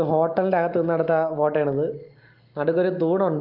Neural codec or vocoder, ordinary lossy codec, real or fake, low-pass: none; Opus, 32 kbps; real; 5.4 kHz